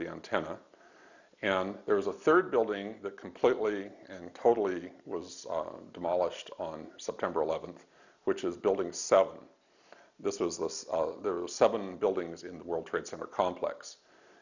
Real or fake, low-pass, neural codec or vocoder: real; 7.2 kHz; none